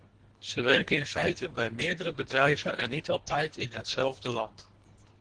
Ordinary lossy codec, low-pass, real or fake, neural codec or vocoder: Opus, 16 kbps; 9.9 kHz; fake; codec, 24 kHz, 1.5 kbps, HILCodec